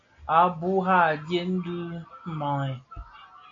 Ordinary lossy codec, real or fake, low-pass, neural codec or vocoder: AAC, 48 kbps; real; 7.2 kHz; none